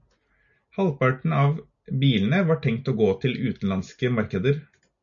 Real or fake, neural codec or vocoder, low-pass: real; none; 7.2 kHz